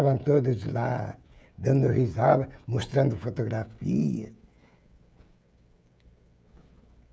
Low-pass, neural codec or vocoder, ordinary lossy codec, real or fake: none; codec, 16 kHz, 16 kbps, FreqCodec, smaller model; none; fake